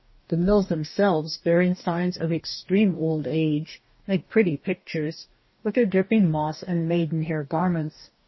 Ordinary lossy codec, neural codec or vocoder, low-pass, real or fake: MP3, 24 kbps; codec, 44.1 kHz, 2.6 kbps, DAC; 7.2 kHz; fake